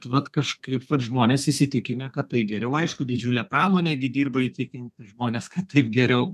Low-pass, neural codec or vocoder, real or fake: 14.4 kHz; codec, 32 kHz, 1.9 kbps, SNAC; fake